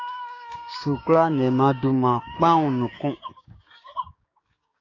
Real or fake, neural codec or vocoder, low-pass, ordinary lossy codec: fake; codec, 16 kHz, 6 kbps, DAC; 7.2 kHz; AAC, 32 kbps